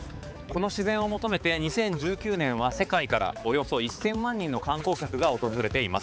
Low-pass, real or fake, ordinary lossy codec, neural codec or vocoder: none; fake; none; codec, 16 kHz, 4 kbps, X-Codec, HuBERT features, trained on balanced general audio